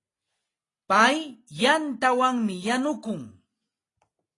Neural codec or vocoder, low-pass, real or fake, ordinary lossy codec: none; 10.8 kHz; real; AAC, 32 kbps